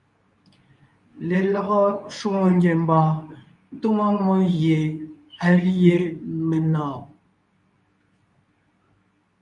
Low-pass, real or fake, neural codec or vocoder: 10.8 kHz; fake; codec, 24 kHz, 0.9 kbps, WavTokenizer, medium speech release version 2